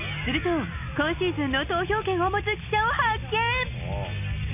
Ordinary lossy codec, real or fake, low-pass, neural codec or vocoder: none; real; 3.6 kHz; none